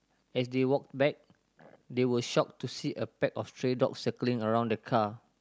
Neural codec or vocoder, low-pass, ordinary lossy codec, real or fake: none; none; none; real